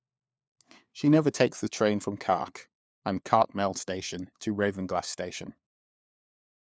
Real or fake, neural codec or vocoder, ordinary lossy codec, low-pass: fake; codec, 16 kHz, 4 kbps, FunCodec, trained on LibriTTS, 50 frames a second; none; none